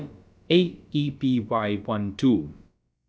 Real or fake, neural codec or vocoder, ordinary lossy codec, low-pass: fake; codec, 16 kHz, about 1 kbps, DyCAST, with the encoder's durations; none; none